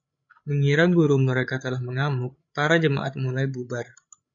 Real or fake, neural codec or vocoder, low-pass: fake; codec, 16 kHz, 8 kbps, FreqCodec, larger model; 7.2 kHz